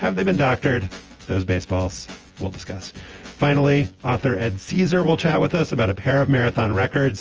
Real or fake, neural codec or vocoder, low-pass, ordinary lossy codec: fake; vocoder, 24 kHz, 100 mel bands, Vocos; 7.2 kHz; Opus, 24 kbps